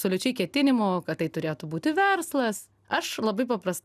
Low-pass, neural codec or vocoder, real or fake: 14.4 kHz; none; real